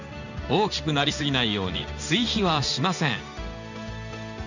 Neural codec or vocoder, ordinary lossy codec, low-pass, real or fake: codec, 16 kHz in and 24 kHz out, 1 kbps, XY-Tokenizer; none; 7.2 kHz; fake